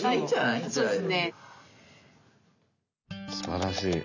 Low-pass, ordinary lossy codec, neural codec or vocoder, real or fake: 7.2 kHz; none; none; real